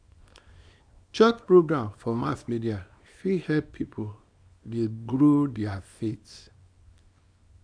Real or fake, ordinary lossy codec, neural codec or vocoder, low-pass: fake; none; codec, 24 kHz, 0.9 kbps, WavTokenizer, small release; 9.9 kHz